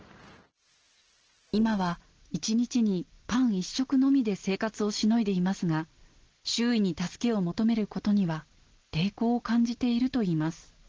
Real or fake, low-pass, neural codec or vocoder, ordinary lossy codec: real; 7.2 kHz; none; Opus, 16 kbps